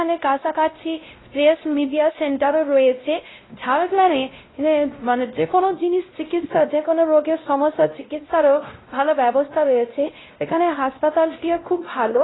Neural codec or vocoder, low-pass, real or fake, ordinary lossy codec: codec, 16 kHz, 0.5 kbps, X-Codec, WavLM features, trained on Multilingual LibriSpeech; 7.2 kHz; fake; AAC, 16 kbps